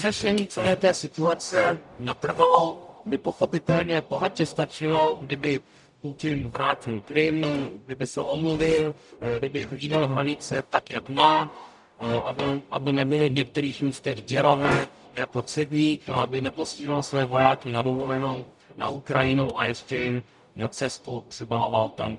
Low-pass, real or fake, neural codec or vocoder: 10.8 kHz; fake; codec, 44.1 kHz, 0.9 kbps, DAC